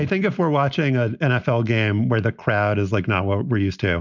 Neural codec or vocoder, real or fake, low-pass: none; real; 7.2 kHz